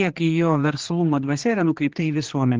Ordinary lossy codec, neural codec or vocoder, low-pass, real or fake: Opus, 16 kbps; codec, 16 kHz, 2 kbps, X-Codec, HuBERT features, trained on general audio; 7.2 kHz; fake